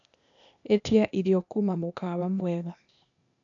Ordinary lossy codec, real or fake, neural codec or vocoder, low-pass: AAC, 64 kbps; fake; codec, 16 kHz, 0.8 kbps, ZipCodec; 7.2 kHz